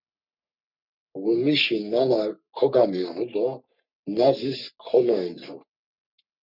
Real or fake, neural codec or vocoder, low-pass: fake; codec, 44.1 kHz, 3.4 kbps, Pupu-Codec; 5.4 kHz